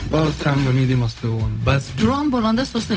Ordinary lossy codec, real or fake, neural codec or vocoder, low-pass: none; fake; codec, 16 kHz, 0.4 kbps, LongCat-Audio-Codec; none